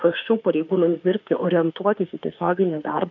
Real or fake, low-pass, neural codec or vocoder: fake; 7.2 kHz; autoencoder, 48 kHz, 32 numbers a frame, DAC-VAE, trained on Japanese speech